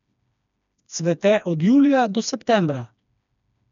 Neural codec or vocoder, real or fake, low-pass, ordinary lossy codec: codec, 16 kHz, 2 kbps, FreqCodec, smaller model; fake; 7.2 kHz; none